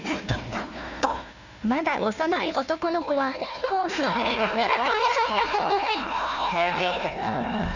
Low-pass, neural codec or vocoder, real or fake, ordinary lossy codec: 7.2 kHz; codec, 16 kHz, 1 kbps, FunCodec, trained on Chinese and English, 50 frames a second; fake; none